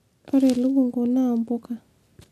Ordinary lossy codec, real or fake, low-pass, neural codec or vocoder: MP3, 64 kbps; real; 14.4 kHz; none